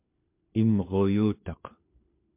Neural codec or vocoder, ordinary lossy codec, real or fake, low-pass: codec, 16 kHz, 4 kbps, FunCodec, trained on LibriTTS, 50 frames a second; MP3, 32 kbps; fake; 3.6 kHz